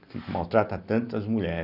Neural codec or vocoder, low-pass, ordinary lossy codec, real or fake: none; 5.4 kHz; none; real